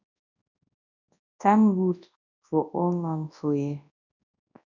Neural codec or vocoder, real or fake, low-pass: codec, 24 kHz, 0.9 kbps, WavTokenizer, large speech release; fake; 7.2 kHz